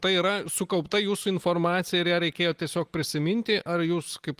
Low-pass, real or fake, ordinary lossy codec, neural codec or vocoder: 14.4 kHz; real; Opus, 24 kbps; none